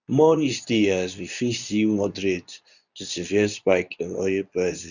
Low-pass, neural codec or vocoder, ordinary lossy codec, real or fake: 7.2 kHz; codec, 24 kHz, 0.9 kbps, WavTokenizer, medium speech release version 2; none; fake